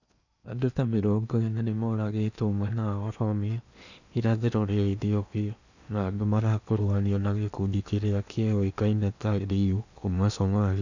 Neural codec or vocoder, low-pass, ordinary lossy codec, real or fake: codec, 16 kHz in and 24 kHz out, 0.8 kbps, FocalCodec, streaming, 65536 codes; 7.2 kHz; none; fake